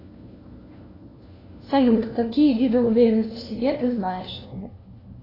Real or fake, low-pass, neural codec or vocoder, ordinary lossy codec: fake; 5.4 kHz; codec, 16 kHz, 1 kbps, FunCodec, trained on LibriTTS, 50 frames a second; AAC, 24 kbps